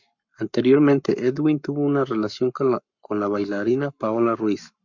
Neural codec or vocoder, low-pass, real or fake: codec, 44.1 kHz, 7.8 kbps, Pupu-Codec; 7.2 kHz; fake